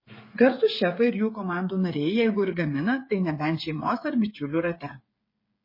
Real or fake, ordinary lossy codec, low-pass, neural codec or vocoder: fake; MP3, 24 kbps; 5.4 kHz; codec, 16 kHz, 8 kbps, FreqCodec, smaller model